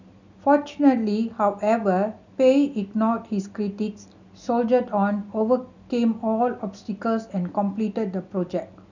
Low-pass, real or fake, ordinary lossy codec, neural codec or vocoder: 7.2 kHz; real; none; none